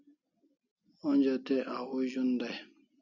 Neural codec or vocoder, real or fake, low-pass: none; real; 7.2 kHz